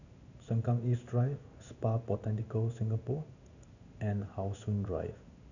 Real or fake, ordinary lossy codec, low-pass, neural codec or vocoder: fake; none; 7.2 kHz; codec, 16 kHz in and 24 kHz out, 1 kbps, XY-Tokenizer